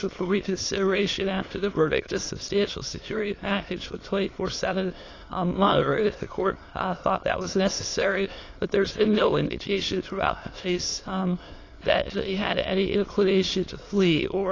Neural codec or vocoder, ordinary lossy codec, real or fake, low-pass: autoencoder, 22.05 kHz, a latent of 192 numbers a frame, VITS, trained on many speakers; AAC, 32 kbps; fake; 7.2 kHz